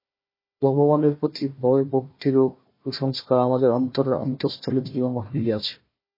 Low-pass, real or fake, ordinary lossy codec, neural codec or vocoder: 5.4 kHz; fake; MP3, 24 kbps; codec, 16 kHz, 1 kbps, FunCodec, trained on Chinese and English, 50 frames a second